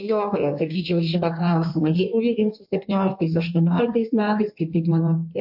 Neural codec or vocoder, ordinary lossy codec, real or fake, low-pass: codec, 16 kHz in and 24 kHz out, 1.1 kbps, FireRedTTS-2 codec; MP3, 48 kbps; fake; 5.4 kHz